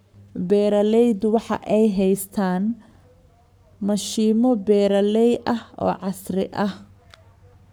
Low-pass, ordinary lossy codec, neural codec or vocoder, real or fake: none; none; codec, 44.1 kHz, 7.8 kbps, Pupu-Codec; fake